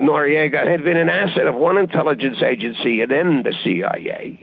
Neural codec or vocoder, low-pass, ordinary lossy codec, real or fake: autoencoder, 48 kHz, 128 numbers a frame, DAC-VAE, trained on Japanese speech; 7.2 kHz; Opus, 24 kbps; fake